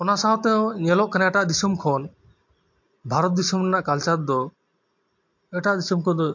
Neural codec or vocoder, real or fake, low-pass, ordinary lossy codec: none; real; 7.2 kHz; MP3, 48 kbps